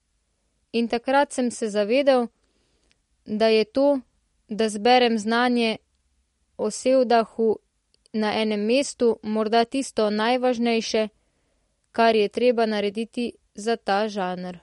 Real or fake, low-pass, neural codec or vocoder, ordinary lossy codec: real; 19.8 kHz; none; MP3, 48 kbps